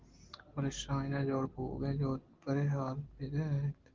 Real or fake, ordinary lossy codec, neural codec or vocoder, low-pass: real; Opus, 16 kbps; none; 7.2 kHz